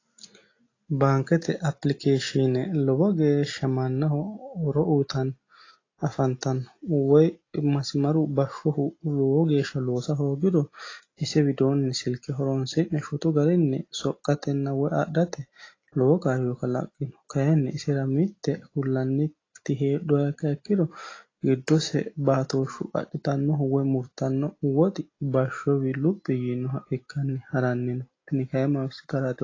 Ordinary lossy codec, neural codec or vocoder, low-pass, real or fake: AAC, 32 kbps; none; 7.2 kHz; real